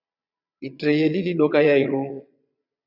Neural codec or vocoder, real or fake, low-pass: vocoder, 22.05 kHz, 80 mel bands, Vocos; fake; 5.4 kHz